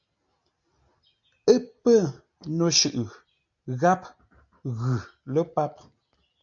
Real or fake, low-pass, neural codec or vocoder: real; 7.2 kHz; none